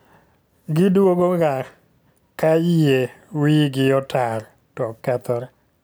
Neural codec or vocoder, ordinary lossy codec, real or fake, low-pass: none; none; real; none